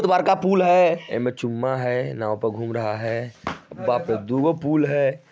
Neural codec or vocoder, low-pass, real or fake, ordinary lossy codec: none; none; real; none